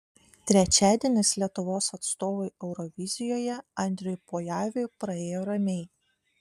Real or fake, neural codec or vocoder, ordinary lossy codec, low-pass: real; none; MP3, 96 kbps; 14.4 kHz